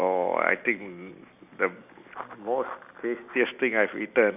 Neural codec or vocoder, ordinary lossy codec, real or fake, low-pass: none; none; real; 3.6 kHz